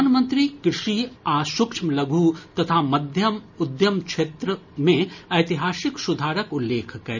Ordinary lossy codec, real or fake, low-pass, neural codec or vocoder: none; real; 7.2 kHz; none